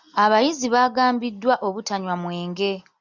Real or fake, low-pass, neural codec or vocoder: real; 7.2 kHz; none